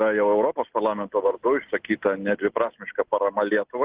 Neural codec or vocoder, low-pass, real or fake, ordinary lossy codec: none; 3.6 kHz; real; Opus, 16 kbps